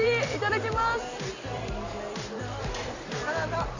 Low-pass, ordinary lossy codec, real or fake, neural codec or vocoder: 7.2 kHz; Opus, 64 kbps; fake; vocoder, 44.1 kHz, 128 mel bands every 256 samples, BigVGAN v2